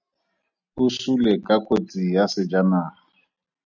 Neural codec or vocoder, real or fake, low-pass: none; real; 7.2 kHz